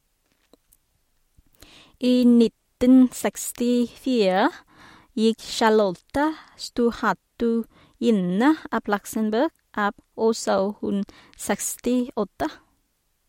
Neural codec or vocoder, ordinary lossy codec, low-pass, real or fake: none; MP3, 64 kbps; 19.8 kHz; real